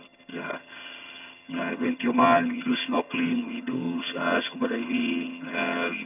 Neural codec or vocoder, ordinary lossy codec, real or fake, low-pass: vocoder, 22.05 kHz, 80 mel bands, HiFi-GAN; none; fake; 3.6 kHz